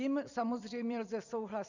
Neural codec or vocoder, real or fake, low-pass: vocoder, 44.1 kHz, 128 mel bands every 256 samples, BigVGAN v2; fake; 7.2 kHz